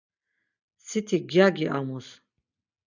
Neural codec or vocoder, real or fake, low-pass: none; real; 7.2 kHz